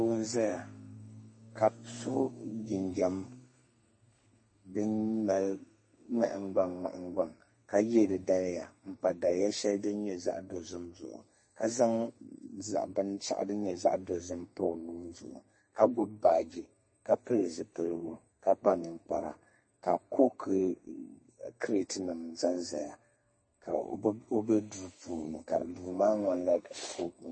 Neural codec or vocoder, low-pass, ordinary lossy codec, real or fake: codec, 32 kHz, 1.9 kbps, SNAC; 9.9 kHz; MP3, 32 kbps; fake